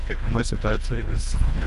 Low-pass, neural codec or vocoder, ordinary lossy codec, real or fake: 10.8 kHz; codec, 24 kHz, 1.5 kbps, HILCodec; AAC, 96 kbps; fake